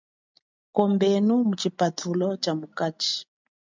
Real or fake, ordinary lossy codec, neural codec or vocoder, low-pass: real; MP3, 64 kbps; none; 7.2 kHz